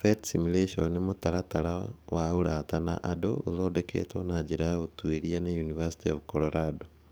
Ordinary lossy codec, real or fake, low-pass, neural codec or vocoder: none; fake; none; codec, 44.1 kHz, 7.8 kbps, DAC